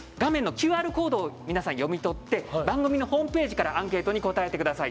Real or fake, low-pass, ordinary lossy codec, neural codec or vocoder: real; none; none; none